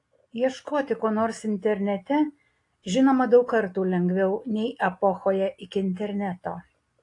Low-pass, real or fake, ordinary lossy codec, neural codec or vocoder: 10.8 kHz; real; AAC, 48 kbps; none